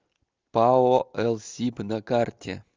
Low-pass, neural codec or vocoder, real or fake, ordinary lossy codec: 7.2 kHz; none; real; Opus, 32 kbps